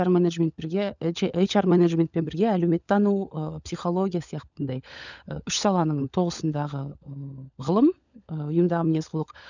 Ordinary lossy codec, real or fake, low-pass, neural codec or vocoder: none; fake; 7.2 kHz; codec, 16 kHz, 16 kbps, FunCodec, trained on LibriTTS, 50 frames a second